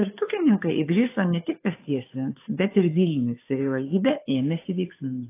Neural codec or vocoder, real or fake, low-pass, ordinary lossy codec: codec, 16 kHz, 2 kbps, FunCodec, trained on Chinese and English, 25 frames a second; fake; 3.6 kHz; AAC, 24 kbps